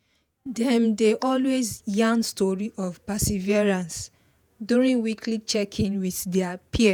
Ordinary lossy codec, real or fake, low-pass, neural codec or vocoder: none; fake; none; vocoder, 48 kHz, 128 mel bands, Vocos